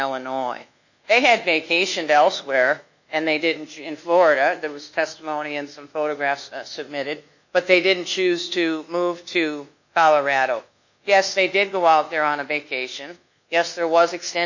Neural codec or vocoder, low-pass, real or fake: codec, 24 kHz, 1.2 kbps, DualCodec; 7.2 kHz; fake